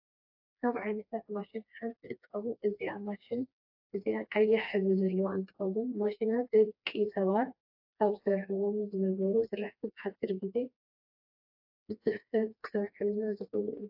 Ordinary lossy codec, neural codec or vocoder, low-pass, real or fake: AAC, 48 kbps; codec, 16 kHz, 2 kbps, FreqCodec, smaller model; 5.4 kHz; fake